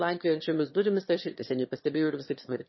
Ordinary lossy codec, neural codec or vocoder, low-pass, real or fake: MP3, 24 kbps; autoencoder, 22.05 kHz, a latent of 192 numbers a frame, VITS, trained on one speaker; 7.2 kHz; fake